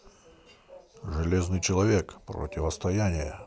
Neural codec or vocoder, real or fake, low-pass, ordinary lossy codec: none; real; none; none